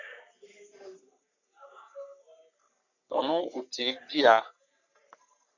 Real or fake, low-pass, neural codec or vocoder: fake; 7.2 kHz; codec, 44.1 kHz, 3.4 kbps, Pupu-Codec